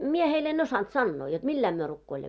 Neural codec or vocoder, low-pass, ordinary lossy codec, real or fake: none; none; none; real